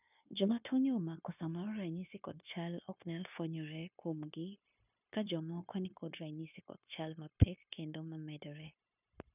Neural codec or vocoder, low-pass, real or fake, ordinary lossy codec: codec, 16 kHz, 0.9 kbps, LongCat-Audio-Codec; 3.6 kHz; fake; none